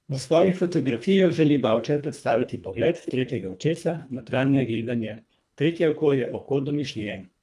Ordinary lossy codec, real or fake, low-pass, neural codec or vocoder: none; fake; none; codec, 24 kHz, 1.5 kbps, HILCodec